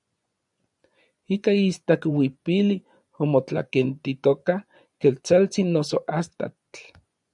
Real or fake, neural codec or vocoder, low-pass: fake; vocoder, 24 kHz, 100 mel bands, Vocos; 10.8 kHz